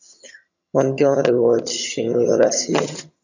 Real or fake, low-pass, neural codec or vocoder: fake; 7.2 kHz; vocoder, 22.05 kHz, 80 mel bands, HiFi-GAN